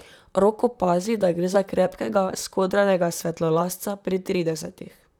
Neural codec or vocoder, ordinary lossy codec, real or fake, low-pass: vocoder, 44.1 kHz, 128 mel bands, Pupu-Vocoder; none; fake; 19.8 kHz